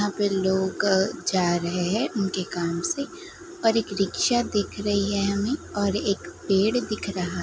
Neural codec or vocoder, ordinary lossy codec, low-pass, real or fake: none; none; none; real